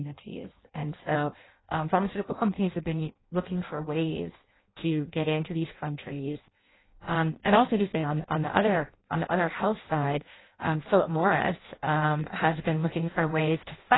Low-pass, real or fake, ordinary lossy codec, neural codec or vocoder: 7.2 kHz; fake; AAC, 16 kbps; codec, 16 kHz in and 24 kHz out, 0.6 kbps, FireRedTTS-2 codec